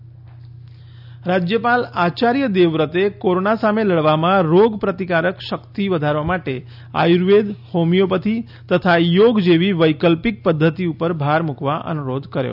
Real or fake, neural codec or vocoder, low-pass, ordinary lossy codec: real; none; 5.4 kHz; none